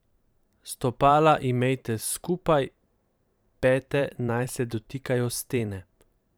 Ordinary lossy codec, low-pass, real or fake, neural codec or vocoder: none; none; fake; vocoder, 44.1 kHz, 128 mel bands every 512 samples, BigVGAN v2